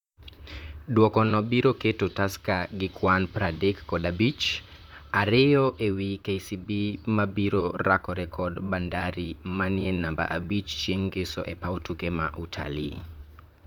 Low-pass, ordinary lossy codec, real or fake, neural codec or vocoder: 19.8 kHz; none; fake; vocoder, 44.1 kHz, 128 mel bands, Pupu-Vocoder